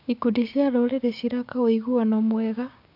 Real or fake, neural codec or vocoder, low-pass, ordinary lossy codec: fake; codec, 16 kHz, 6 kbps, DAC; 5.4 kHz; none